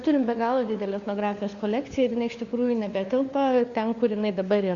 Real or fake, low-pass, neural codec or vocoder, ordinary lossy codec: fake; 7.2 kHz; codec, 16 kHz, 4 kbps, FunCodec, trained on LibriTTS, 50 frames a second; Opus, 64 kbps